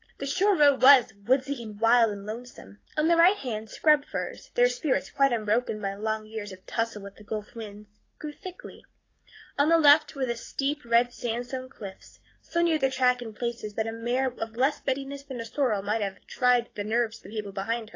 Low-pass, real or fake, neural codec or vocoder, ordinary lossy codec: 7.2 kHz; fake; codec, 44.1 kHz, 7.8 kbps, DAC; AAC, 32 kbps